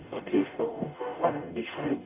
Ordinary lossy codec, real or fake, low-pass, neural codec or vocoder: AAC, 32 kbps; fake; 3.6 kHz; codec, 44.1 kHz, 0.9 kbps, DAC